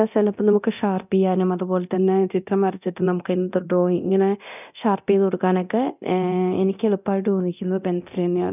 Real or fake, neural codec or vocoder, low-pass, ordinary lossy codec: fake; codec, 24 kHz, 0.9 kbps, DualCodec; 3.6 kHz; none